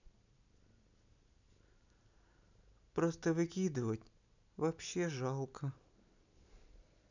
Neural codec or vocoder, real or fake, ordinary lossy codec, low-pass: none; real; none; 7.2 kHz